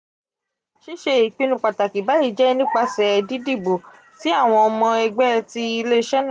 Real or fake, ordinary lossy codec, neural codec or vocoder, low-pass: real; none; none; 9.9 kHz